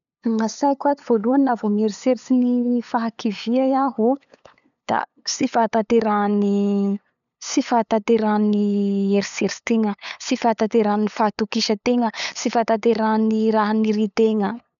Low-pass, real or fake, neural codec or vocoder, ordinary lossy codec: 7.2 kHz; fake; codec, 16 kHz, 8 kbps, FunCodec, trained on LibriTTS, 25 frames a second; none